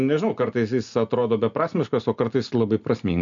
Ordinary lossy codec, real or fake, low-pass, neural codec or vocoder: MP3, 64 kbps; real; 7.2 kHz; none